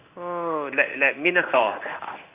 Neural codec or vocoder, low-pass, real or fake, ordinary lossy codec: codec, 16 kHz in and 24 kHz out, 1 kbps, XY-Tokenizer; 3.6 kHz; fake; Opus, 64 kbps